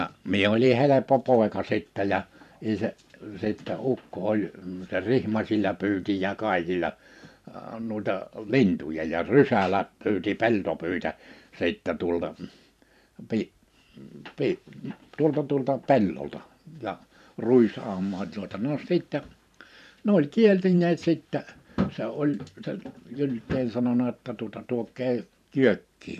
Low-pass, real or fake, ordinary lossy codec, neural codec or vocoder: 14.4 kHz; fake; none; vocoder, 44.1 kHz, 128 mel bands every 512 samples, BigVGAN v2